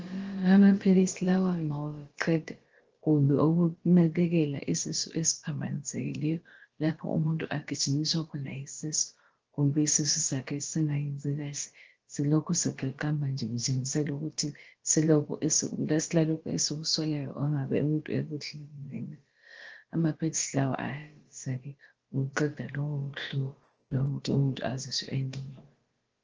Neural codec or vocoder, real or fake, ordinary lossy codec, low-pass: codec, 16 kHz, about 1 kbps, DyCAST, with the encoder's durations; fake; Opus, 16 kbps; 7.2 kHz